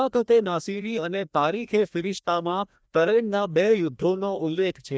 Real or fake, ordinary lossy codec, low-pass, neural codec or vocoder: fake; none; none; codec, 16 kHz, 1 kbps, FreqCodec, larger model